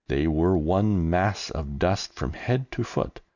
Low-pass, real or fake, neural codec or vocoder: 7.2 kHz; real; none